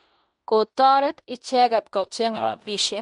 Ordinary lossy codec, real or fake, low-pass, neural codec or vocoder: MP3, 64 kbps; fake; 10.8 kHz; codec, 16 kHz in and 24 kHz out, 0.9 kbps, LongCat-Audio-Codec, fine tuned four codebook decoder